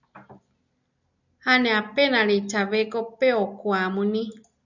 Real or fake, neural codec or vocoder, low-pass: real; none; 7.2 kHz